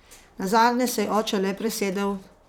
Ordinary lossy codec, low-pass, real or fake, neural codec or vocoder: none; none; fake; vocoder, 44.1 kHz, 128 mel bands, Pupu-Vocoder